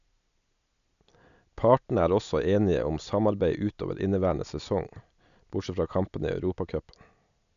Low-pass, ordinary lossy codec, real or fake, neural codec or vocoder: 7.2 kHz; none; real; none